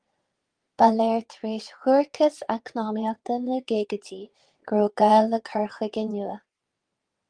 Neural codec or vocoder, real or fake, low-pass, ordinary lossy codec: vocoder, 22.05 kHz, 80 mel bands, WaveNeXt; fake; 9.9 kHz; Opus, 24 kbps